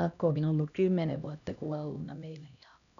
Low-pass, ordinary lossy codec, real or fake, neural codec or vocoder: 7.2 kHz; none; fake; codec, 16 kHz, 1 kbps, X-Codec, HuBERT features, trained on LibriSpeech